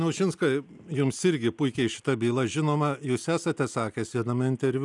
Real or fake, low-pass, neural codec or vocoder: real; 10.8 kHz; none